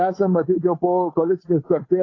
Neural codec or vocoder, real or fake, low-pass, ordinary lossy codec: codec, 16 kHz, 8 kbps, FunCodec, trained on Chinese and English, 25 frames a second; fake; 7.2 kHz; AAC, 32 kbps